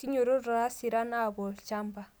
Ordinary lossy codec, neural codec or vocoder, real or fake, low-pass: none; none; real; none